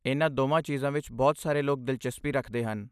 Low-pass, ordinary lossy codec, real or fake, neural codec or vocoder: 14.4 kHz; none; real; none